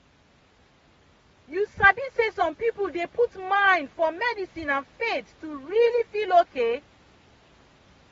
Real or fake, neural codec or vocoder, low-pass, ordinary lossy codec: real; none; 19.8 kHz; AAC, 24 kbps